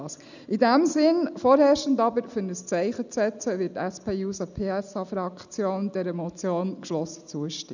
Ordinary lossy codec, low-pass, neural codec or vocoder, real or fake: none; 7.2 kHz; none; real